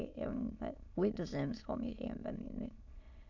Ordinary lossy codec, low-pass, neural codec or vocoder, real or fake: none; 7.2 kHz; autoencoder, 22.05 kHz, a latent of 192 numbers a frame, VITS, trained on many speakers; fake